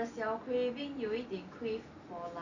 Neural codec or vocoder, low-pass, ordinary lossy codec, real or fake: none; 7.2 kHz; none; real